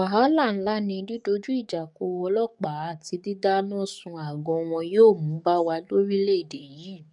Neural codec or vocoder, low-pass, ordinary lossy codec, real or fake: codec, 44.1 kHz, 7.8 kbps, DAC; 10.8 kHz; MP3, 96 kbps; fake